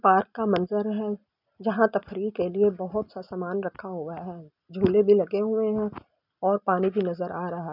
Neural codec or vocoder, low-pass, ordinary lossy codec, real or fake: none; 5.4 kHz; none; real